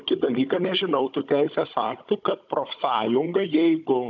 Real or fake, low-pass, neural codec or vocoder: fake; 7.2 kHz; codec, 16 kHz, 16 kbps, FunCodec, trained on Chinese and English, 50 frames a second